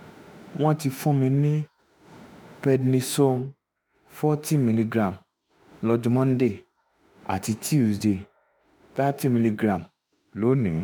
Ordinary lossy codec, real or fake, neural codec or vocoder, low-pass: none; fake; autoencoder, 48 kHz, 32 numbers a frame, DAC-VAE, trained on Japanese speech; none